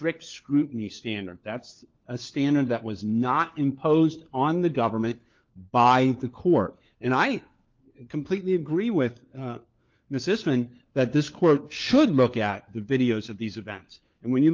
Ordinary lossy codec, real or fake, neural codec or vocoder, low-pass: Opus, 24 kbps; fake; codec, 16 kHz, 4 kbps, FunCodec, trained on LibriTTS, 50 frames a second; 7.2 kHz